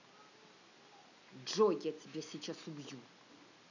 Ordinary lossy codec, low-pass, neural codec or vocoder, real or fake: none; 7.2 kHz; none; real